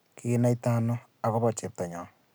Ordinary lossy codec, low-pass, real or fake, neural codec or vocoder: none; none; real; none